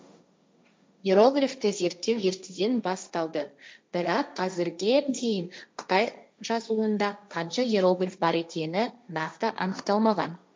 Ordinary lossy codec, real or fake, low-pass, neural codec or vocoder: none; fake; none; codec, 16 kHz, 1.1 kbps, Voila-Tokenizer